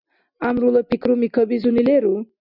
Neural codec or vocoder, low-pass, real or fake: none; 5.4 kHz; real